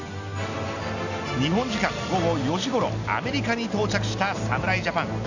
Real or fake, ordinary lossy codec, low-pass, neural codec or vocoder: real; none; 7.2 kHz; none